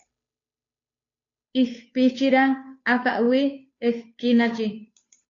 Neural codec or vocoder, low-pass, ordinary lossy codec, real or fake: codec, 16 kHz, 2 kbps, FunCodec, trained on Chinese and English, 25 frames a second; 7.2 kHz; AAC, 48 kbps; fake